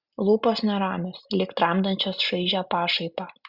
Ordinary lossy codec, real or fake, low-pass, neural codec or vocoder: Opus, 64 kbps; real; 5.4 kHz; none